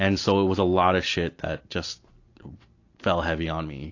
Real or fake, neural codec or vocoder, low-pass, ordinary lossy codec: real; none; 7.2 kHz; AAC, 48 kbps